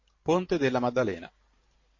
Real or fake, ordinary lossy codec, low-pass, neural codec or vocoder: fake; MP3, 32 kbps; 7.2 kHz; vocoder, 44.1 kHz, 128 mel bands every 512 samples, BigVGAN v2